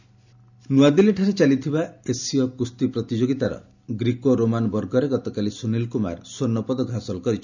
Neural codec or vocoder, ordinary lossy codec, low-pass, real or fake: none; none; 7.2 kHz; real